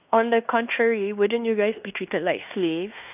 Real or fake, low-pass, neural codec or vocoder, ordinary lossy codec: fake; 3.6 kHz; codec, 16 kHz in and 24 kHz out, 0.9 kbps, LongCat-Audio-Codec, fine tuned four codebook decoder; none